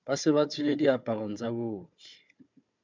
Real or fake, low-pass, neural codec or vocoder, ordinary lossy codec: fake; 7.2 kHz; codec, 16 kHz, 4 kbps, FunCodec, trained on Chinese and English, 50 frames a second; MP3, 64 kbps